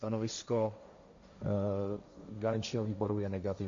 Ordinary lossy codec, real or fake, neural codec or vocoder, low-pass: MP3, 48 kbps; fake; codec, 16 kHz, 1.1 kbps, Voila-Tokenizer; 7.2 kHz